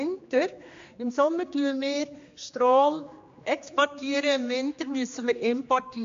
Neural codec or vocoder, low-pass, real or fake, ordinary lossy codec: codec, 16 kHz, 2 kbps, X-Codec, HuBERT features, trained on general audio; 7.2 kHz; fake; MP3, 48 kbps